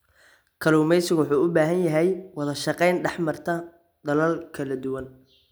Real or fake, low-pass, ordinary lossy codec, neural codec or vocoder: real; none; none; none